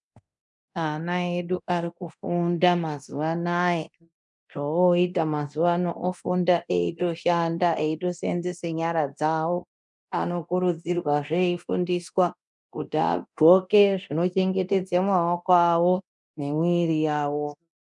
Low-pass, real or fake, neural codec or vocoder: 10.8 kHz; fake; codec, 24 kHz, 0.9 kbps, DualCodec